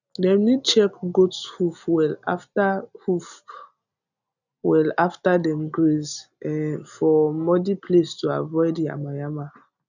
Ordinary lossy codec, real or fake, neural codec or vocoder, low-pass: none; real; none; 7.2 kHz